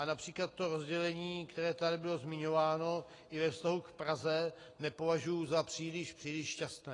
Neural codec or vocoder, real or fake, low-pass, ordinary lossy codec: none; real; 10.8 kHz; AAC, 32 kbps